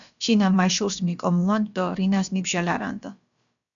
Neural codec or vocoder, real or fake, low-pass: codec, 16 kHz, about 1 kbps, DyCAST, with the encoder's durations; fake; 7.2 kHz